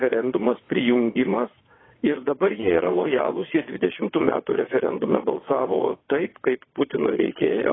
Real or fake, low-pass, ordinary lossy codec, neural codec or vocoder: fake; 7.2 kHz; AAC, 16 kbps; vocoder, 22.05 kHz, 80 mel bands, WaveNeXt